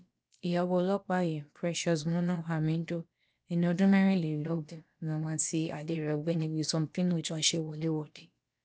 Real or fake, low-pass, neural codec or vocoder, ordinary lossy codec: fake; none; codec, 16 kHz, about 1 kbps, DyCAST, with the encoder's durations; none